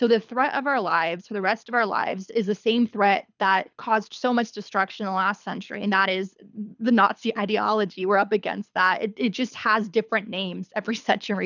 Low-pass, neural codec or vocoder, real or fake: 7.2 kHz; codec, 24 kHz, 6 kbps, HILCodec; fake